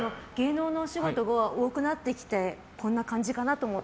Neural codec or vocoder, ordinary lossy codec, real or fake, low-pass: none; none; real; none